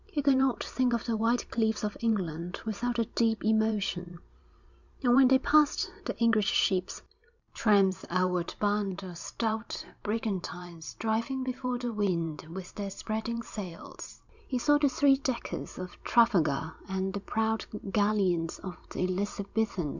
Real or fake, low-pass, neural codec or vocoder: fake; 7.2 kHz; vocoder, 44.1 kHz, 128 mel bands every 512 samples, BigVGAN v2